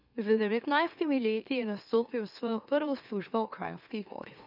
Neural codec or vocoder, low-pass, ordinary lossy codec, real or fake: autoencoder, 44.1 kHz, a latent of 192 numbers a frame, MeloTTS; 5.4 kHz; none; fake